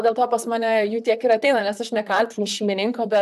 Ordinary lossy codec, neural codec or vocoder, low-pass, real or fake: AAC, 96 kbps; vocoder, 44.1 kHz, 128 mel bands, Pupu-Vocoder; 14.4 kHz; fake